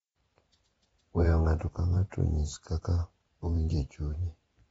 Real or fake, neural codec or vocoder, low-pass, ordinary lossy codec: fake; vocoder, 44.1 kHz, 128 mel bands every 512 samples, BigVGAN v2; 19.8 kHz; AAC, 24 kbps